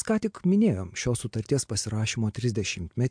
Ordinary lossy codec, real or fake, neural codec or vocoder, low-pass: MP3, 64 kbps; real; none; 9.9 kHz